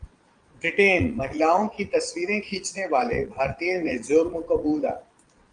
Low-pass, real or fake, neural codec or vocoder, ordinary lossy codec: 9.9 kHz; fake; vocoder, 22.05 kHz, 80 mel bands, Vocos; Opus, 32 kbps